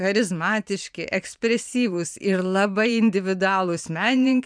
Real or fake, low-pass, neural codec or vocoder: fake; 9.9 kHz; vocoder, 44.1 kHz, 128 mel bands every 512 samples, BigVGAN v2